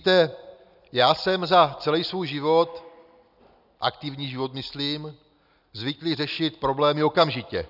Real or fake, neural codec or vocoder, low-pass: real; none; 5.4 kHz